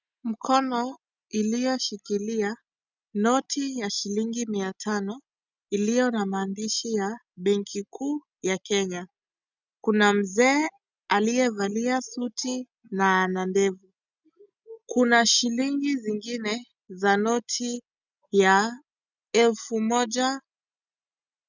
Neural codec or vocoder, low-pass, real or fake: none; 7.2 kHz; real